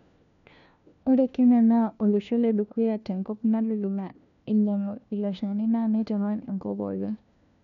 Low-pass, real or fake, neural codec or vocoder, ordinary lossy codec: 7.2 kHz; fake; codec, 16 kHz, 1 kbps, FunCodec, trained on LibriTTS, 50 frames a second; none